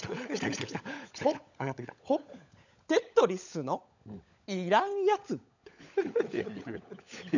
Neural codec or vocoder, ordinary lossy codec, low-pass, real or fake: codec, 16 kHz, 16 kbps, FunCodec, trained on LibriTTS, 50 frames a second; none; 7.2 kHz; fake